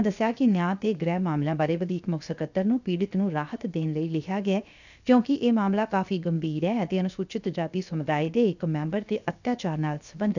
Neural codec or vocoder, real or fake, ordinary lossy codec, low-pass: codec, 16 kHz, 0.7 kbps, FocalCodec; fake; none; 7.2 kHz